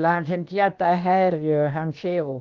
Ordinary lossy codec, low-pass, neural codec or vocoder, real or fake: Opus, 24 kbps; 7.2 kHz; codec, 16 kHz, about 1 kbps, DyCAST, with the encoder's durations; fake